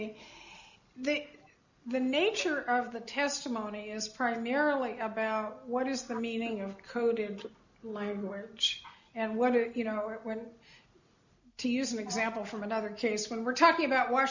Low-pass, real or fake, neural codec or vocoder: 7.2 kHz; real; none